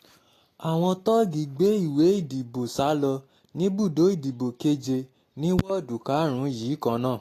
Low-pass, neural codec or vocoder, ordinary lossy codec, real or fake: 14.4 kHz; none; AAC, 48 kbps; real